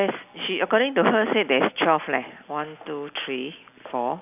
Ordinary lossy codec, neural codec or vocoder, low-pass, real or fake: none; none; 3.6 kHz; real